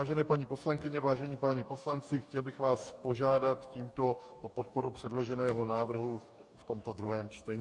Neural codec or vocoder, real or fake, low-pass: codec, 44.1 kHz, 2.6 kbps, DAC; fake; 10.8 kHz